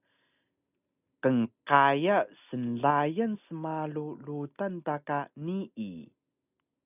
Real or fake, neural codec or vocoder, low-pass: real; none; 3.6 kHz